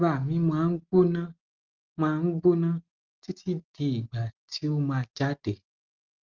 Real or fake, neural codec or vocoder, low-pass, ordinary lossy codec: real; none; 7.2 kHz; Opus, 32 kbps